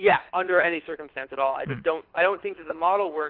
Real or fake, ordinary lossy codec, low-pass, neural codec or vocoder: fake; Opus, 32 kbps; 5.4 kHz; codec, 24 kHz, 6 kbps, HILCodec